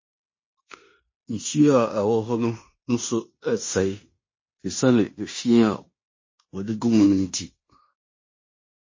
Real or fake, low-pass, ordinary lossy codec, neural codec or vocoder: fake; 7.2 kHz; MP3, 32 kbps; codec, 16 kHz in and 24 kHz out, 0.9 kbps, LongCat-Audio-Codec, fine tuned four codebook decoder